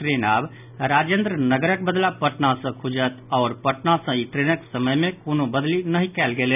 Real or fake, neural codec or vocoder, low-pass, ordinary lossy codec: real; none; 3.6 kHz; none